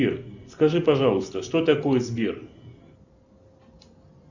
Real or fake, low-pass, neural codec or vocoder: real; 7.2 kHz; none